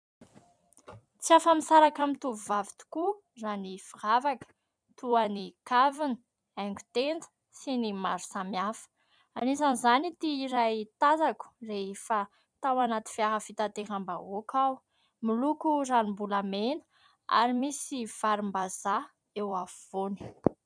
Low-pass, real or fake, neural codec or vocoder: 9.9 kHz; fake; vocoder, 44.1 kHz, 128 mel bands every 256 samples, BigVGAN v2